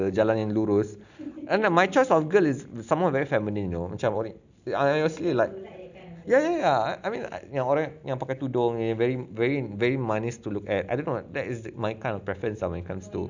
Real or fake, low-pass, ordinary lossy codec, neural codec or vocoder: real; 7.2 kHz; none; none